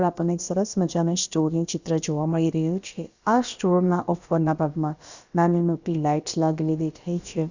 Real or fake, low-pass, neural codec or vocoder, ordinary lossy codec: fake; 7.2 kHz; codec, 16 kHz, about 1 kbps, DyCAST, with the encoder's durations; Opus, 64 kbps